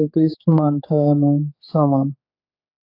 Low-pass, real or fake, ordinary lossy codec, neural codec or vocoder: 5.4 kHz; fake; AAC, 32 kbps; codec, 16 kHz, 4 kbps, X-Codec, HuBERT features, trained on general audio